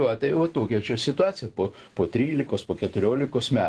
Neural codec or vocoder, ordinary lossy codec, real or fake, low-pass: vocoder, 24 kHz, 100 mel bands, Vocos; Opus, 16 kbps; fake; 10.8 kHz